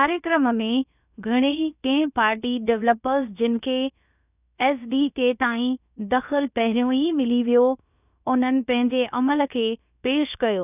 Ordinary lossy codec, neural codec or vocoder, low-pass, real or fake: none; codec, 16 kHz, about 1 kbps, DyCAST, with the encoder's durations; 3.6 kHz; fake